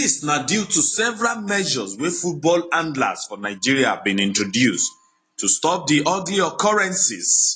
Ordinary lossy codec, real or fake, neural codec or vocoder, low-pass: AAC, 32 kbps; real; none; 9.9 kHz